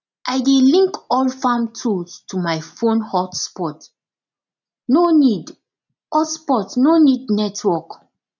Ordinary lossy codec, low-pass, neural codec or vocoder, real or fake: none; 7.2 kHz; none; real